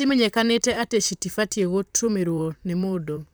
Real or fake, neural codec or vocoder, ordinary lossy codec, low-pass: fake; vocoder, 44.1 kHz, 128 mel bands, Pupu-Vocoder; none; none